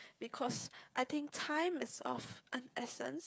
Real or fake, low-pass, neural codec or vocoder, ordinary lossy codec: fake; none; codec, 16 kHz, 8 kbps, FreqCodec, smaller model; none